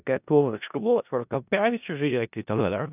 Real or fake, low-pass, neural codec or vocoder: fake; 3.6 kHz; codec, 16 kHz in and 24 kHz out, 0.4 kbps, LongCat-Audio-Codec, four codebook decoder